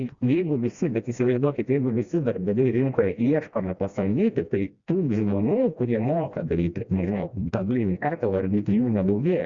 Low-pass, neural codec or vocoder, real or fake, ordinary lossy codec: 7.2 kHz; codec, 16 kHz, 1 kbps, FreqCodec, smaller model; fake; Opus, 64 kbps